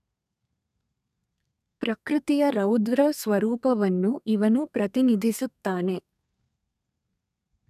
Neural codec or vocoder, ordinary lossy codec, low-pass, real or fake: codec, 32 kHz, 1.9 kbps, SNAC; none; 14.4 kHz; fake